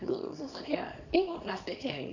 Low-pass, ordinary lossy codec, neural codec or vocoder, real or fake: 7.2 kHz; none; codec, 24 kHz, 0.9 kbps, WavTokenizer, small release; fake